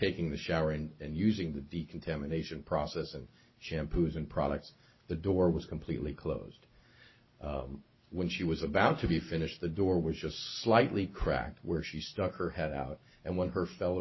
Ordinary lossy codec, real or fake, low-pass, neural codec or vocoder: MP3, 24 kbps; real; 7.2 kHz; none